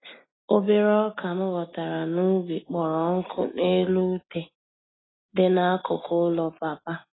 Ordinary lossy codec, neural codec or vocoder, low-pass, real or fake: AAC, 16 kbps; none; 7.2 kHz; real